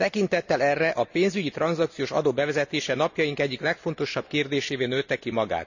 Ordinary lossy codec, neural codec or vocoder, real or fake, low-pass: none; none; real; 7.2 kHz